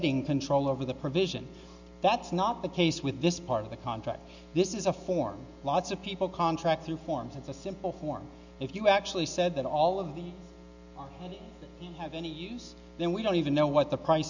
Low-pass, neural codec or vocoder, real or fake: 7.2 kHz; none; real